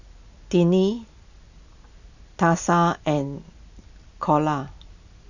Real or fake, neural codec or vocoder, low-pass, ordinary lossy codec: real; none; 7.2 kHz; none